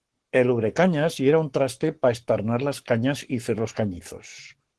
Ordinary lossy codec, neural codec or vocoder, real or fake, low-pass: Opus, 16 kbps; codec, 44.1 kHz, 7.8 kbps, Pupu-Codec; fake; 10.8 kHz